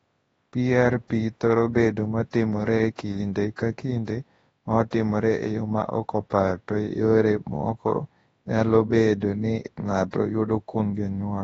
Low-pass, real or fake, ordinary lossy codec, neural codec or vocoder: 10.8 kHz; fake; AAC, 24 kbps; codec, 24 kHz, 0.9 kbps, WavTokenizer, large speech release